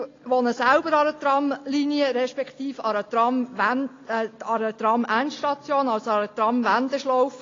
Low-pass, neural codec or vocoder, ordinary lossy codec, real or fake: 7.2 kHz; none; AAC, 32 kbps; real